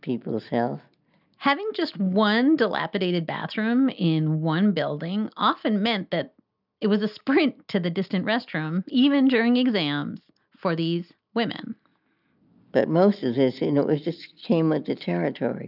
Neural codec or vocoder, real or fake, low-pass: none; real; 5.4 kHz